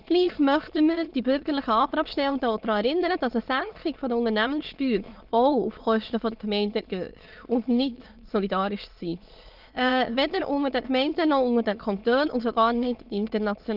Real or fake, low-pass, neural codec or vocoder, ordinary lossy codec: fake; 5.4 kHz; autoencoder, 22.05 kHz, a latent of 192 numbers a frame, VITS, trained on many speakers; Opus, 32 kbps